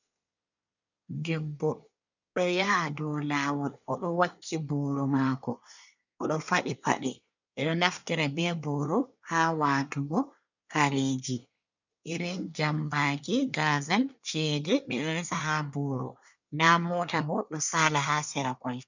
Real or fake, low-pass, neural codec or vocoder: fake; 7.2 kHz; codec, 24 kHz, 1 kbps, SNAC